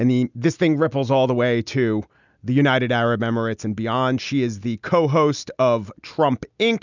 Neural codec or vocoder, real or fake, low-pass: none; real; 7.2 kHz